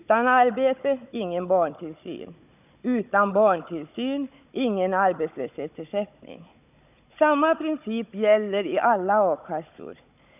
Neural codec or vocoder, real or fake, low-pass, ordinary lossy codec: codec, 16 kHz, 16 kbps, FunCodec, trained on Chinese and English, 50 frames a second; fake; 3.6 kHz; none